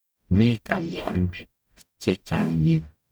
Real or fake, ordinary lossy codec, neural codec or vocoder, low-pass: fake; none; codec, 44.1 kHz, 0.9 kbps, DAC; none